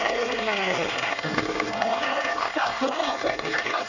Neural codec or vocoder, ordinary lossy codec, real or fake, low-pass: codec, 24 kHz, 1 kbps, SNAC; none; fake; 7.2 kHz